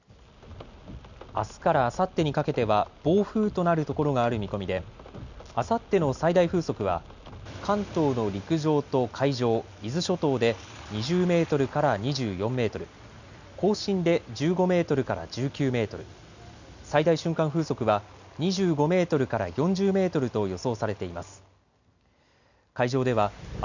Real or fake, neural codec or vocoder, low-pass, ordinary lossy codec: real; none; 7.2 kHz; none